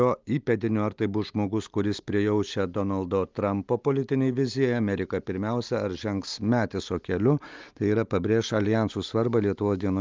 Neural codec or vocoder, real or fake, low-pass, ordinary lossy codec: none; real; 7.2 kHz; Opus, 32 kbps